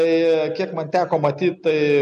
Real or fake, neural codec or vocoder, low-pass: real; none; 10.8 kHz